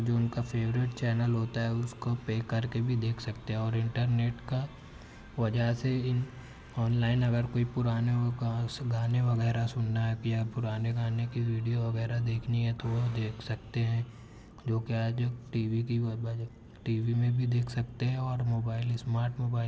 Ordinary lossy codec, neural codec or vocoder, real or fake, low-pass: none; none; real; none